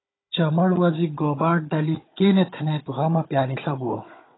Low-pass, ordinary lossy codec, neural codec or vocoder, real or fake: 7.2 kHz; AAC, 16 kbps; codec, 16 kHz, 16 kbps, FunCodec, trained on Chinese and English, 50 frames a second; fake